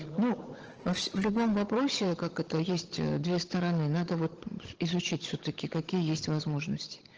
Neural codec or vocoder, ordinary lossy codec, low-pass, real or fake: codec, 16 kHz, 16 kbps, FunCodec, trained on Chinese and English, 50 frames a second; Opus, 16 kbps; 7.2 kHz; fake